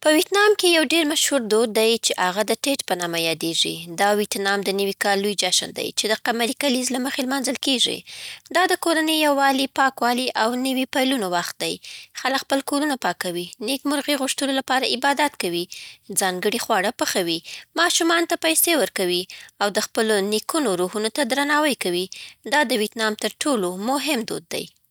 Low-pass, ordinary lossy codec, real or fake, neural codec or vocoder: none; none; real; none